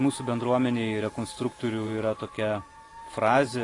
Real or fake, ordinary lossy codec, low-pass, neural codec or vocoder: fake; AAC, 32 kbps; 10.8 kHz; vocoder, 44.1 kHz, 128 mel bands every 512 samples, BigVGAN v2